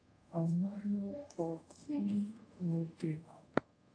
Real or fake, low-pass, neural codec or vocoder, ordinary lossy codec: fake; 9.9 kHz; codec, 24 kHz, 0.5 kbps, DualCodec; AAC, 64 kbps